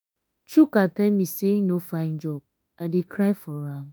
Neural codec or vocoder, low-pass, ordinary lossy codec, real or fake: autoencoder, 48 kHz, 32 numbers a frame, DAC-VAE, trained on Japanese speech; none; none; fake